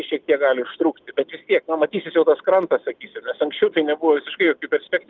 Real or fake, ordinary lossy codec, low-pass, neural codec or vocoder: real; Opus, 24 kbps; 7.2 kHz; none